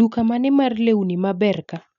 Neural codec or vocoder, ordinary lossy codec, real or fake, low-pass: none; none; real; 7.2 kHz